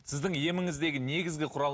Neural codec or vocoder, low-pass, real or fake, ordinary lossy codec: none; none; real; none